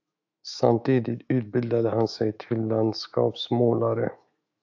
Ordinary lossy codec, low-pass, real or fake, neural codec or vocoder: AAC, 48 kbps; 7.2 kHz; fake; autoencoder, 48 kHz, 128 numbers a frame, DAC-VAE, trained on Japanese speech